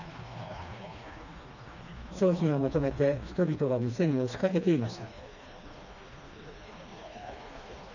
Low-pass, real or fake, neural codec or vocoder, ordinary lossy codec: 7.2 kHz; fake; codec, 16 kHz, 2 kbps, FreqCodec, smaller model; none